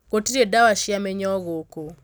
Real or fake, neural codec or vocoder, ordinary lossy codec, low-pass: real; none; none; none